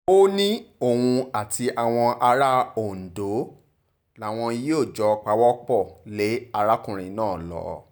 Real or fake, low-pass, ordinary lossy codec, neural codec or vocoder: real; none; none; none